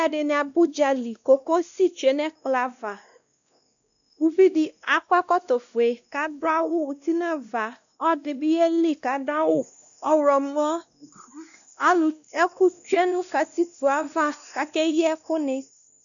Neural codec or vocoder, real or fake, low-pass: codec, 16 kHz, 1 kbps, X-Codec, WavLM features, trained on Multilingual LibriSpeech; fake; 7.2 kHz